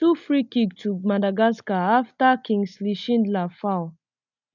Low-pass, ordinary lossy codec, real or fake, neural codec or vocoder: 7.2 kHz; none; real; none